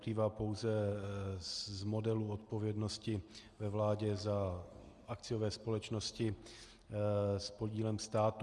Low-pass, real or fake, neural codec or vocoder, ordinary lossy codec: 10.8 kHz; real; none; Opus, 32 kbps